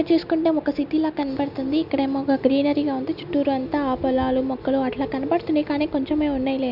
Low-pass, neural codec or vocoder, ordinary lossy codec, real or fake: 5.4 kHz; none; none; real